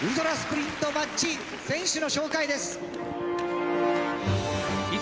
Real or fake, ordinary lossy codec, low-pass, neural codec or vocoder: real; none; none; none